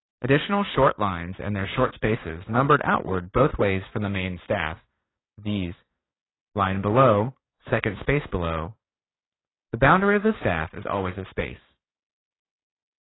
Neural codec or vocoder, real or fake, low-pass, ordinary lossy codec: codec, 16 kHz, 6 kbps, DAC; fake; 7.2 kHz; AAC, 16 kbps